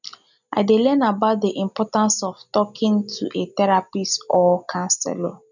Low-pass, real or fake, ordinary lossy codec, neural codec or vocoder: 7.2 kHz; real; none; none